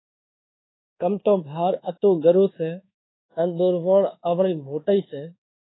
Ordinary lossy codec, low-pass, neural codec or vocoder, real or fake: AAC, 16 kbps; 7.2 kHz; codec, 24 kHz, 1.2 kbps, DualCodec; fake